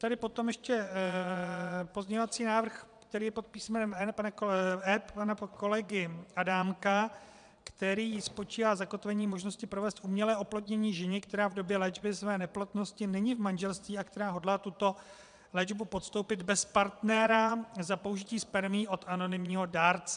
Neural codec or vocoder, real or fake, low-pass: vocoder, 22.05 kHz, 80 mel bands, Vocos; fake; 9.9 kHz